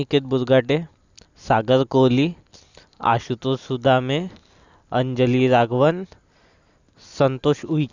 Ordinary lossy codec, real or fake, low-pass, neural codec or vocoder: Opus, 64 kbps; real; 7.2 kHz; none